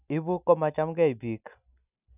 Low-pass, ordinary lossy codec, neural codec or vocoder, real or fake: 3.6 kHz; none; none; real